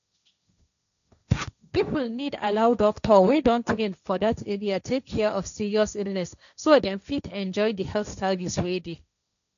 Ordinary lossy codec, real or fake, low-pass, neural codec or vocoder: none; fake; 7.2 kHz; codec, 16 kHz, 1.1 kbps, Voila-Tokenizer